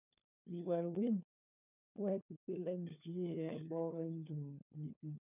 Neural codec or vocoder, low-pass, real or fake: codec, 16 kHz, 4 kbps, FunCodec, trained on LibriTTS, 50 frames a second; 3.6 kHz; fake